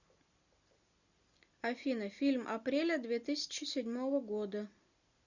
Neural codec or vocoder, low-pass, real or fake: none; 7.2 kHz; real